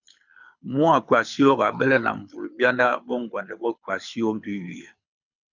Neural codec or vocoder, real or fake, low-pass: codec, 24 kHz, 6 kbps, HILCodec; fake; 7.2 kHz